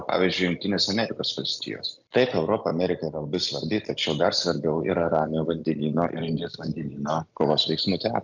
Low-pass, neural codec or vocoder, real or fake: 7.2 kHz; none; real